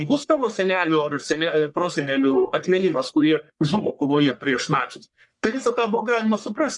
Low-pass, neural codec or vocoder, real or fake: 10.8 kHz; codec, 44.1 kHz, 1.7 kbps, Pupu-Codec; fake